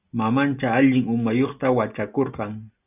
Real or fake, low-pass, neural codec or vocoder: real; 3.6 kHz; none